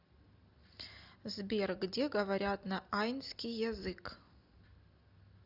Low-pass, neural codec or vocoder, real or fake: 5.4 kHz; none; real